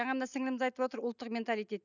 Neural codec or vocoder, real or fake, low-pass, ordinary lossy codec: none; real; 7.2 kHz; none